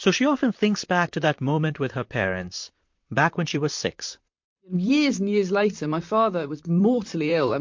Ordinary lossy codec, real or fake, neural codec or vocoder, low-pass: MP3, 48 kbps; fake; vocoder, 44.1 kHz, 128 mel bands, Pupu-Vocoder; 7.2 kHz